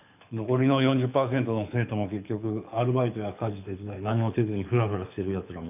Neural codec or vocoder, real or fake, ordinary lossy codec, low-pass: codec, 24 kHz, 6 kbps, HILCodec; fake; none; 3.6 kHz